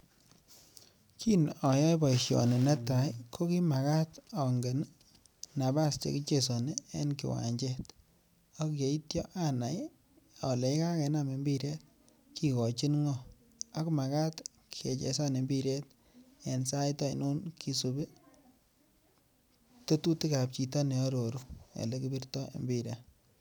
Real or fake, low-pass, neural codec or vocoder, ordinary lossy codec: real; none; none; none